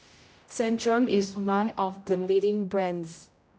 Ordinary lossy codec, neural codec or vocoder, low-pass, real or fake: none; codec, 16 kHz, 0.5 kbps, X-Codec, HuBERT features, trained on general audio; none; fake